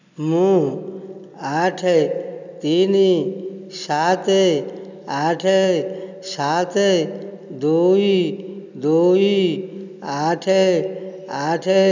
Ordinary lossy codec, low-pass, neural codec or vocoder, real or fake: none; 7.2 kHz; none; real